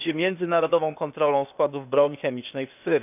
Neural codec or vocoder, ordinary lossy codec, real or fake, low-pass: codec, 16 kHz, 0.8 kbps, ZipCodec; none; fake; 3.6 kHz